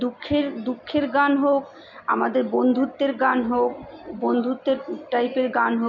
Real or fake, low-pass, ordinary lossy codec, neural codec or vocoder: real; 7.2 kHz; none; none